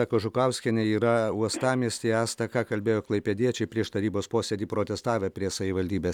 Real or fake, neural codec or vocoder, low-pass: real; none; 19.8 kHz